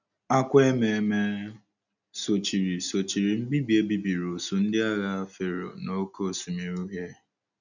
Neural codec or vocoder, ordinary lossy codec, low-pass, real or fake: none; none; 7.2 kHz; real